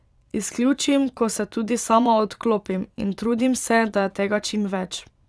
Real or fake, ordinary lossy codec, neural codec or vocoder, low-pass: fake; none; vocoder, 22.05 kHz, 80 mel bands, Vocos; none